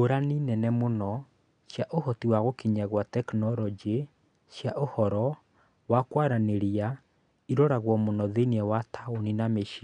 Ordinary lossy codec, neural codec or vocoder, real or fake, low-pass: MP3, 96 kbps; none; real; 9.9 kHz